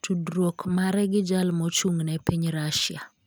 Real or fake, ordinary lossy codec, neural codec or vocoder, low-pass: real; none; none; none